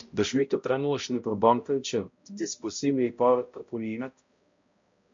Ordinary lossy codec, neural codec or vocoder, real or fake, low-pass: MP3, 48 kbps; codec, 16 kHz, 0.5 kbps, X-Codec, HuBERT features, trained on balanced general audio; fake; 7.2 kHz